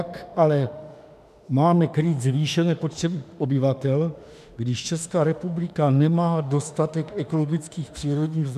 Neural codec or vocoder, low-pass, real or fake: autoencoder, 48 kHz, 32 numbers a frame, DAC-VAE, trained on Japanese speech; 14.4 kHz; fake